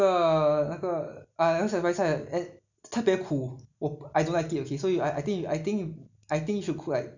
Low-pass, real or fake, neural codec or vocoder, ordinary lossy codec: 7.2 kHz; real; none; none